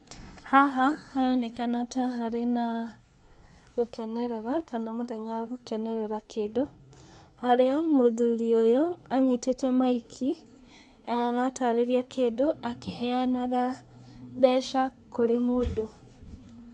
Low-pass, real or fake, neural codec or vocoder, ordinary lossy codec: 10.8 kHz; fake; codec, 24 kHz, 1 kbps, SNAC; AAC, 64 kbps